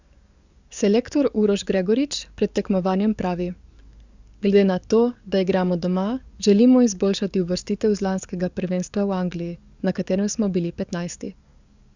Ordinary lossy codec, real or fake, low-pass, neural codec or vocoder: Opus, 64 kbps; fake; 7.2 kHz; codec, 44.1 kHz, 7.8 kbps, DAC